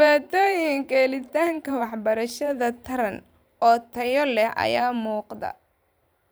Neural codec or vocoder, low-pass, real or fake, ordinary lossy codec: vocoder, 44.1 kHz, 128 mel bands every 512 samples, BigVGAN v2; none; fake; none